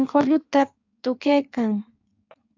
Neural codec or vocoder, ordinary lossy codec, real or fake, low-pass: codec, 16 kHz in and 24 kHz out, 1.1 kbps, FireRedTTS-2 codec; AAC, 48 kbps; fake; 7.2 kHz